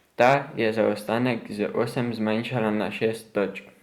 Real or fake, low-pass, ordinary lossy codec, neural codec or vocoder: fake; 19.8 kHz; Opus, 64 kbps; vocoder, 44.1 kHz, 128 mel bands every 512 samples, BigVGAN v2